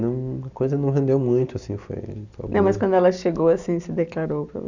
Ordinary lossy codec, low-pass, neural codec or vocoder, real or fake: none; 7.2 kHz; none; real